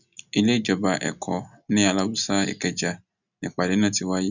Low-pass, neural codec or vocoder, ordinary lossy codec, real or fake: 7.2 kHz; none; none; real